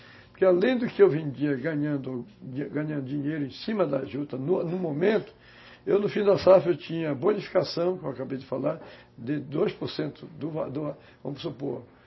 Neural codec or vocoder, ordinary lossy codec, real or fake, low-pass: none; MP3, 24 kbps; real; 7.2 kHz